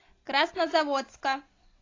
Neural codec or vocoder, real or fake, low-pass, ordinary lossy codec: none; real; 7.2 kHz; AAC, 32 kbps